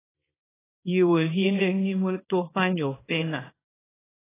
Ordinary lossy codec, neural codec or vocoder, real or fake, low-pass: AAC, 16 kbps; codec, 24 kHz, 0.9 kbps, WavTokenizer, small release; fake; 3.6 kHz